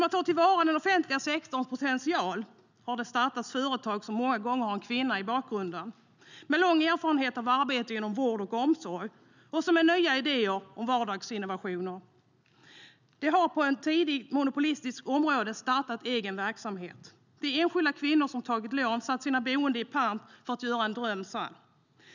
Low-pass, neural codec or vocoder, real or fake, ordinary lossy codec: 7.2 kHz; none; real; none